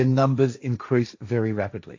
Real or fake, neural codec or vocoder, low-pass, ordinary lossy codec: fake; codec, 16 kHz, 1.1 kbps, Voila-Tokenizer; 7.2 kHz; AAC, 48 kbps